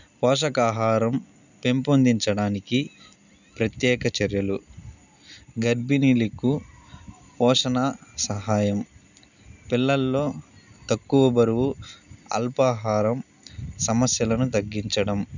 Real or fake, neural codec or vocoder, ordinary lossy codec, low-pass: real; none; none; 7.2 kHz